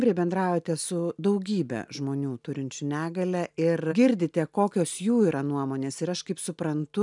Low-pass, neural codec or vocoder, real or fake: 10.8 kHz; none; real